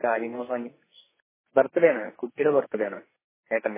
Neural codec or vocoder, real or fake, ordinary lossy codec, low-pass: codec, 44.1 kHz, 2.6 kbps, SNAC; fake; MP3, 16 kbps; 3.6 kHz